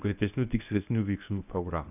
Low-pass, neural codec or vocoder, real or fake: 3.6 kHz; codec, 24 kHz, 0.9 kbps, WavTokenizer, medium speech release version 1; fake